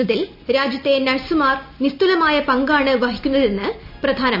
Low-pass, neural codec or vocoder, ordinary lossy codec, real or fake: 5.4 kHz; none; none; real